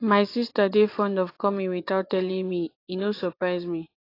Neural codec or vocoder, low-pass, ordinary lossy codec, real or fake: vocoder, 24 kHz, 100 mel bands, Vocos; 5.4 kHz; AAC, 32 kbps; fake